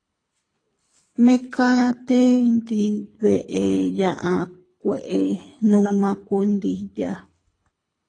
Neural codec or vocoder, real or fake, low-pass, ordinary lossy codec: codec, 24 kHz, 3 kbps, HILCodec; fake; 9.9 kHz; AAC, 32 kbps